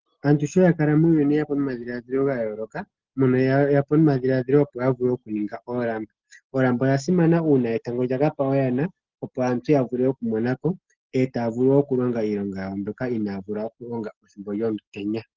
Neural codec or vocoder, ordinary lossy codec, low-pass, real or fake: none; Opus, 16 kbps; 7.2 kHz; real